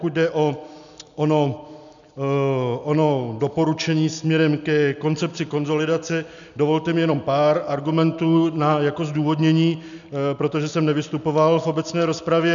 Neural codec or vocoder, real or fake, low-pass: none; real; 7.2 kHz